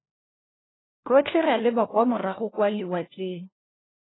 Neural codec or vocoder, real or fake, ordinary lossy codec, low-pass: codec, 16 kHz, 1 kbps, FunCodec, trained on LibriTTS, 50 frames a second; fake; AAC, 16 kbps; 7.2 kHz